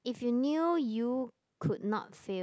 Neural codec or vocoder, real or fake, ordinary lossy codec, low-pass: none; real; none; none